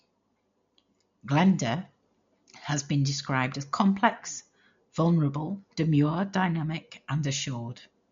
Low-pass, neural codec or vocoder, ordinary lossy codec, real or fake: 7.2 kHz; none; MP3, 48 kbps; real